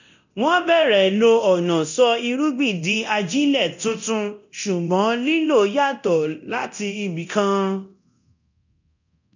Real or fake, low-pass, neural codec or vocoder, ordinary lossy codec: fake; 7.2 kHz; codec, 24 kHz, 0.9 kbps, DualCodec; none